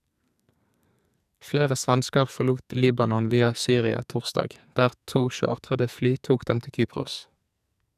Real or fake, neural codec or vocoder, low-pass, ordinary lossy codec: fake; codec, 44.1 kHz, 2.6 kbps, SNAC; 14.4 kHz; none